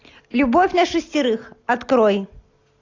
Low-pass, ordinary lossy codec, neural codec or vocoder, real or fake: 7.2 kHz; AAC, 48 kbps; none; real